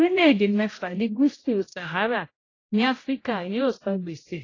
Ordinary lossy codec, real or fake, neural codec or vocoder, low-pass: AAC, 32 kbps; fake; codec, 16 kHz, 0.5 kbps, X-Codec, HuBERT features, trained on general audio; 7.2 kHz